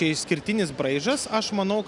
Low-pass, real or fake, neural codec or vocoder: 10.8 kHz; real; none